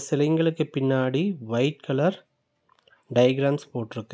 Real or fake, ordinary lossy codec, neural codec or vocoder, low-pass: real; none; none; none